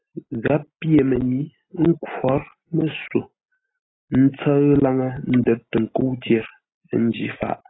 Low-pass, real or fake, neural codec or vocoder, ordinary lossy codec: 7.2 kHz; real; none; AAC, 16 kbps